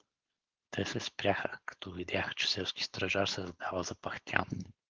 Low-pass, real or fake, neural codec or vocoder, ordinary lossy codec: 7.2 kHz; fake; codec, 16 kHz, 4.8 kbps, FACodec; Opus, 16 kbps